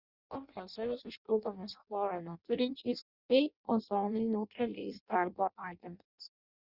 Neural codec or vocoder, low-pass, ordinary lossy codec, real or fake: codec, 16 kHz in and 24 kHz out, 0.6 kbps, FireRedTTS-2 codec; 5.4 kHz; Opus, 64 kbps; fake